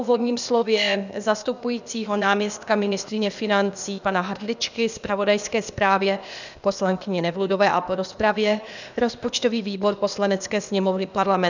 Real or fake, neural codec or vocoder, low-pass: fake; codec, 16 kHz, 0.8 kbps, ZipCodec; 7.2 kHz